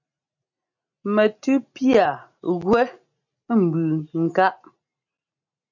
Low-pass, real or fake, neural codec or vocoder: 7.2 kHz; real; none